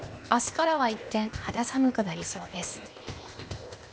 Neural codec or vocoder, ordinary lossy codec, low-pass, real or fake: codec, 16 kHz, 0.8 kbps, ZipCodec; none; none; fake